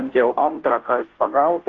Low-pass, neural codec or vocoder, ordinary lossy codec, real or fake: 7.2 kHz; codec, 16 kHz, 0.5 kbps, FunCodec, trained on Chinese and English, 25 frames a second; Opus, 16 kbps; fake